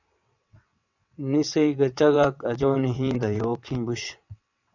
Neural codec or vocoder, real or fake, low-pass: vocoder, 22.05 kHz, 80 mel bands, WaveNeXt; fake; 7.2 kHz